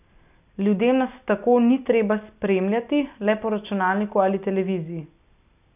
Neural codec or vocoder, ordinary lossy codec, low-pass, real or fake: none; none; 3.6 kHz; real